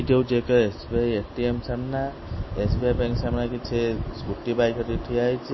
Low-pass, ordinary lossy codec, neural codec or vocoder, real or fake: 7.2 kHz; MP3, 24 kbps; none; real